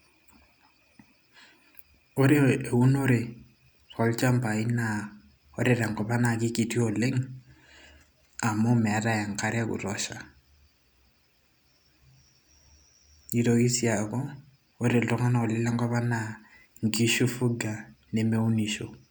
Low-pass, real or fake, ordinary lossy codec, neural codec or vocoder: none; real; none; none